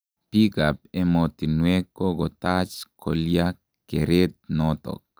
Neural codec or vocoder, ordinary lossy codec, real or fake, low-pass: none; none; real; none